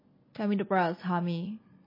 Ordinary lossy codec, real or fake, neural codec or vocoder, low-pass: MP3, 24 kbps; real; none; 5.4 kHz